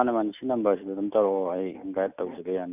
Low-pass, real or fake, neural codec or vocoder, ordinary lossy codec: 3.6 kHz; real; none; none